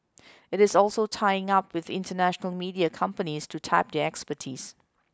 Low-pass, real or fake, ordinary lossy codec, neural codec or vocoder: none; real; none; none